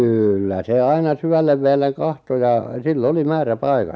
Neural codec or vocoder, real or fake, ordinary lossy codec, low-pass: none; real; none; none